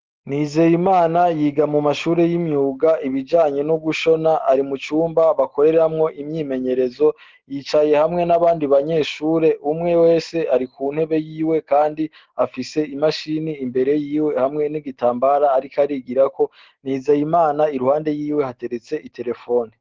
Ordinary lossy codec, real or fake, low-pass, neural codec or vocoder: Opus, 16 kbps; real; 7.2 kHz; none